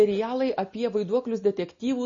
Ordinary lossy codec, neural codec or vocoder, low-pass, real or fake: MP3, 32 kbps; none; 7.2 kHz; real